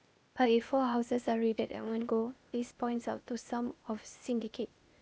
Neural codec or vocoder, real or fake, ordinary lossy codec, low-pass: codec, 16 kHz, 0.8 kbps, ZipCodec; fake; none; none